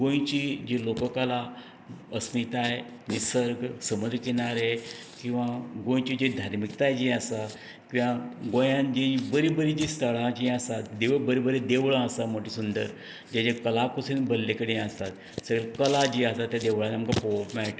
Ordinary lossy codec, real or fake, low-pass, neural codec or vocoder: none; real; none; none